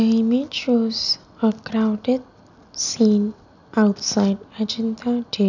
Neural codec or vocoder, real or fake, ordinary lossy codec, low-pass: none; real; none; 7.2 kHz